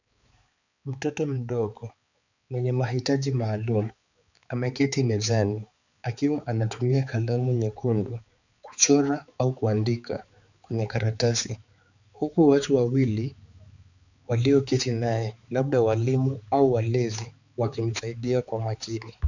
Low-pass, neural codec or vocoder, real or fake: 7.2 kHz; codec, 16 kHz, 4 kbps, X-Codec, HuBERT features, trained on balanced general audio; fake